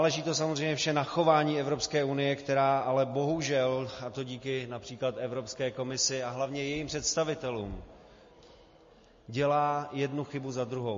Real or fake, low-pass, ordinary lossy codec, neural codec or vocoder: real; 7.2 kHz; MP3, 32 kbps; none